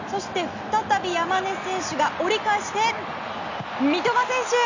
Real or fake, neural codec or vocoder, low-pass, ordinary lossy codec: real; none; 7.2 kHz; none